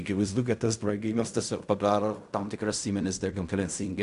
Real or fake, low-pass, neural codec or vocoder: fake; 10.8 kHz; codec, 16 kHz in and 24 kHz out, 0.4 kbps, LongCat-Audio-Codec, fine tuned four codebook decoder